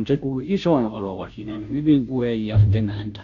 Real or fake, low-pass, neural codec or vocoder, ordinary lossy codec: fake; 7.2 kHz; codec, 16 kHz, 0.5 kbps, FunCodec, trained on Chinese and English, 25 frames a second; none